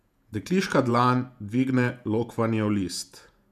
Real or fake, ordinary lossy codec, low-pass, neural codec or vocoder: fake; none; 14.4 kHz; vocoder, 48 kHz, 128 mel bands, Vocos